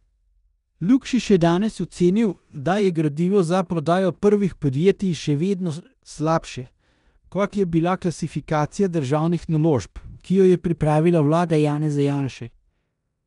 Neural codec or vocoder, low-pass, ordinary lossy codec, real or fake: codec, 16 kHz in and 24 kHz out, 0.9 kbps, LongCat-Audio-Codec, four codebook decoder; 10.8 kHz; none; fake